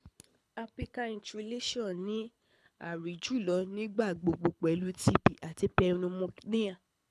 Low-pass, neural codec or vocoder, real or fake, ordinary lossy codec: none; codec, 24 kHz, 6 kbps, HILCodec; fake; none